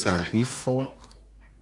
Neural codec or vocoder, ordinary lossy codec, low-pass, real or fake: codec, 24 kHz, 1 kbps, SNAC; MP3, 96 kbps; 10.8 kHz; fake